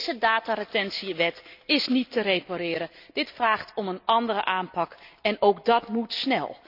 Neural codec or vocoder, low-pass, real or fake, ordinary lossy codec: none; 5.4 kHz; real; none